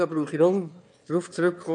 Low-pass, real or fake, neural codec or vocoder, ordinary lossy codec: 9.9 kHz; fake; autoencoder, 22.05 kHz, a latent of 192 numbers a frame, VITS, trained on one speaker; none